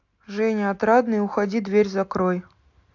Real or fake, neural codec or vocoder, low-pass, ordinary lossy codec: real; none; 7.2 kHz; AAC, 48 kbps